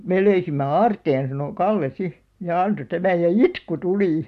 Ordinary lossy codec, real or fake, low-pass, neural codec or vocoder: MP3, 64 kbps; real; 14.4 kHz; none